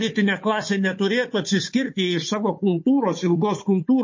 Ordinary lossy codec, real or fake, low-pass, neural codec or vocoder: MP3, 32 kbps; fake; 7.2 kHz; codec, 16 kHz, 4 kbps, FunCodec, trained on Chinese and English, 50 frames a second